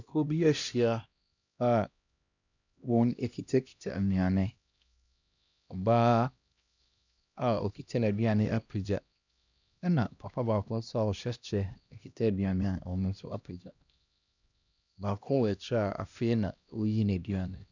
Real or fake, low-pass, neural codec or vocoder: fake; 7.2 kHz; codec, 16 kHz, 1 kbps, X-Codec, HuBERT features, trained on LibriSpeech